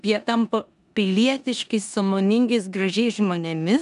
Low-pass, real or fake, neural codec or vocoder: 10.8 kHz; fake; codec, 16 kHz in and 24 kHz out, 0.9 kbps, LongCat-Audio-Codec, four codebook decoder